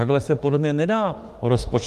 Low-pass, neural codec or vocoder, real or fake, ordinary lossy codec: 14.4 kHz; autoencoder, 48 kHz, 32 numbers a frame, DAC-VAE, trained on Japanese speech; fake; Opus, 24 kbps